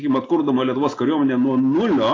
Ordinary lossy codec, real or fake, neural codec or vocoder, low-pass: AAC, 32 kbps; real; none; 7.2 kHz